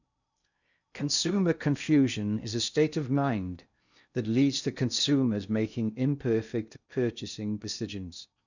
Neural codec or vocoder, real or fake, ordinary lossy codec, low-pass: codec, 16 kHz in and 24 kHz out, 0.6 kbps, FocalCodec, streaming, 4096 codes; fake; none; 7.2 kHz